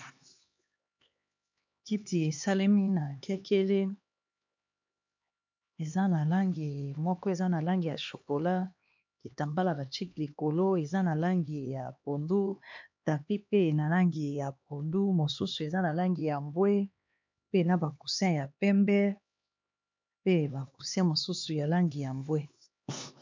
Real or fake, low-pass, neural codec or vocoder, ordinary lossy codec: fake; 7.2 kHz; codec, 16 kHz, 2 kbps, X-Codec, HuBERT features, trained on LibriSpeech; MP3, 64 kbps